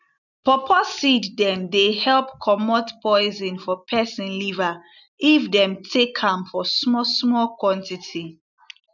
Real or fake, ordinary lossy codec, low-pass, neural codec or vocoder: real; none; 7.2 kHz; none